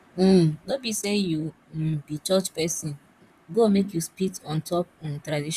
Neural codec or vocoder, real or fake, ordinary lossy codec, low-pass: vocoder, 44.1 kHz, 128 mel bands, Pupu-Vocoder; fake; none; 14.4 kHz